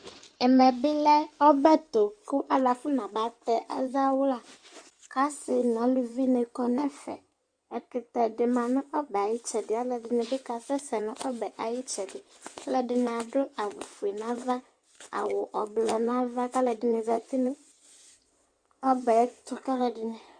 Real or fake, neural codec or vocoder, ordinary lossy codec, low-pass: fake; codec, 16 kHz in and 24 kHz out, 2.2 kbps, FireRedTTS-2 codec; Opus, 64 kbps; 9.9 kHz